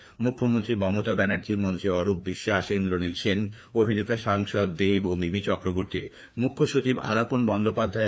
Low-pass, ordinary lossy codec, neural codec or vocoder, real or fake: none; none; codec, 16 kHz, 2 kbps, FreqCodec, larger model; fake